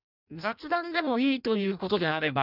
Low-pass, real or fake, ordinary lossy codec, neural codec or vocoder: 5.4 kHz; fake; none; codec, 16 kHz in and 24 kHz out, 0.6 kbps, FireRedTTS-2 codec